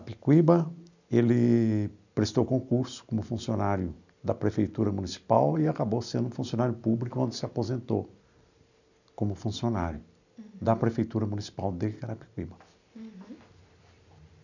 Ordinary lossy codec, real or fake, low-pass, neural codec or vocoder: none; real; 7.2 kHz; none